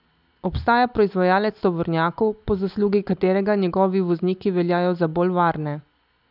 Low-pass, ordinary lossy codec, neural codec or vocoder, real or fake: 5.4 kHz; none; none; real